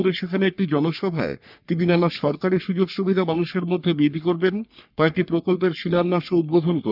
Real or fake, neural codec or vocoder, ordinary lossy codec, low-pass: fake; codec, 44.1 kHz, 3.4 kbps, Pupu-Codec; none; 5.4 kHz